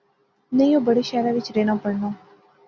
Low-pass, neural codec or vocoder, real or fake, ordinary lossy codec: 7.2 kHz; none; real; Opus, 64 kbps